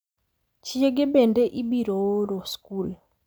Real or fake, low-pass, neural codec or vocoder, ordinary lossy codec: real; none; none; none